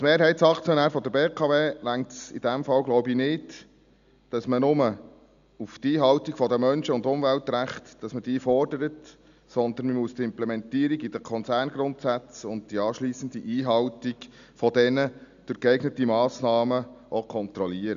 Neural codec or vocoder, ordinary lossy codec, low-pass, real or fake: none; none; 7.2 kHz; real